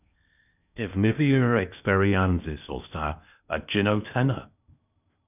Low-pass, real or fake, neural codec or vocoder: 3.6 kHz; fake; codec, 16 kHz in and 24 kHz out, 0.8 kbps, FocalCodec, streaming, 65536 codes